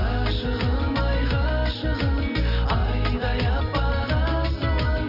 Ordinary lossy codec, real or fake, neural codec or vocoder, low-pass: none; real; none; 5.4 kHz